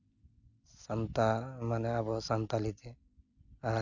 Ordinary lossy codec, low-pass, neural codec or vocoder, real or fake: none; 7.2 kHz; none; real